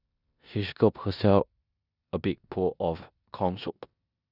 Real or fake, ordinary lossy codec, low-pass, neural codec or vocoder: fake; none; 5.4 kHz; codec, 16 kHz in and 24 kHz out, 0.9 kbps, LongCat-Audio-Codec, four codebook decoder